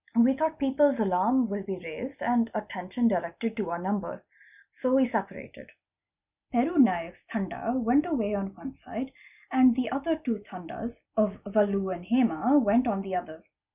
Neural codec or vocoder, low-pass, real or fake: none; 3.6 kHz; real